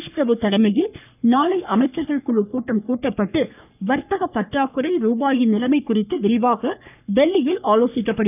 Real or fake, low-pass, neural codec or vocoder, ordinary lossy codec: fake; 3.6 kHz; codec, 44.1 kHz, 3.4 kbps, Pupu-Codec; none